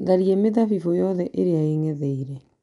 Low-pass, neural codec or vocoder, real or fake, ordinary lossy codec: 10.8 kHz; none; real; none